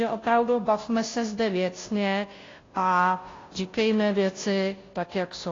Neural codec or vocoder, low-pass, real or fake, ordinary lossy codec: codec, 16 kHz, 0.5 kbps, FunCodec, trained on Chinese and English, 25 frames a second; 7.2 kHz; fake; AAC, 32 kbps